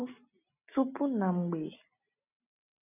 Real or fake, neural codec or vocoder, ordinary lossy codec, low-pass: real; none; none; 3.6 kHz